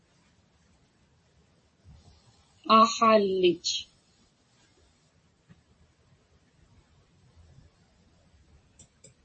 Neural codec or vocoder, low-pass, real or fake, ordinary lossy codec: vocoder, 44.1 kHz, 128 mel bands every 256 samples, BigVGAN v2; 10.8 kHz; fake; MP3, 32 kbps